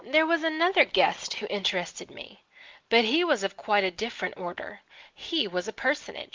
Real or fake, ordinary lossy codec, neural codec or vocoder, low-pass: real; Opus, 24 kbps; none; 7.2 kHz